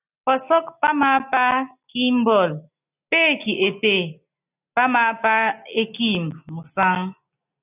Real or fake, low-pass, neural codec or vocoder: real; 3.6 kHz; none